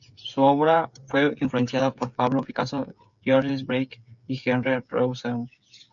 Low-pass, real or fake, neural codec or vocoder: 7.2 kHz; fake; codec, 16 kHz, 8 kbps, FreqCodec, smaller model